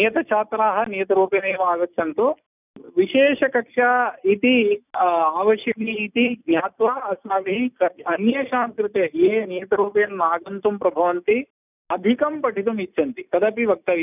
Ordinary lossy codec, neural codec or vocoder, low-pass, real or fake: none; none; 3.6 kHz; real